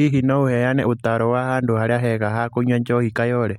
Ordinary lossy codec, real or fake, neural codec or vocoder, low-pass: MP3, 64 kbps; real; none; 19.8 kHz